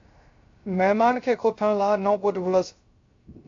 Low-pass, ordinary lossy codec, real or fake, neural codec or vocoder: 7.2 kHz; AAC, 48 kbps; fake; codec, 16 kHz, 0.3 kbps, FocalCodec